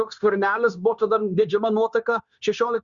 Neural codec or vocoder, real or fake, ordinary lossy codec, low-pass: codec, 16 kHz, 0.9 kbps, LongCat-Audio-Codec; fake; Opus, 64 kbps; 7.2 kHz